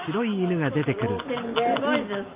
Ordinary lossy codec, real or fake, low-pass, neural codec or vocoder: Opus, 32 kbps; real; 3.6 kHz; none